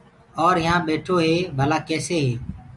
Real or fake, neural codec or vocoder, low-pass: real; none; 10.8 kHz